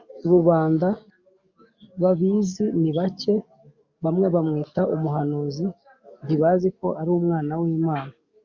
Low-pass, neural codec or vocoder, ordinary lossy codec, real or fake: 7.2 kHz; codec, 44.1 kHz, 7.8 kbps, DAC; Opus, 64 kbps; fake